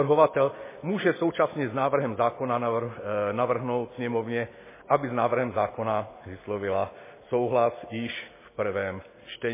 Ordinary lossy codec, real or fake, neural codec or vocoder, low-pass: MP3, 16 kbps; real; none; 3.6 kHz